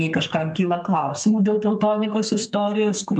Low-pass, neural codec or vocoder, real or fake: 10.8 kHz; codec, 32 kHz, 1.9 kbps, SNAC; fake